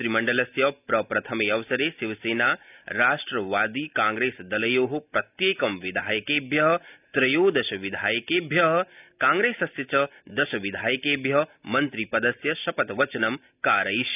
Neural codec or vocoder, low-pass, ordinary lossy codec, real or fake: none; 3.6 kHz; none; real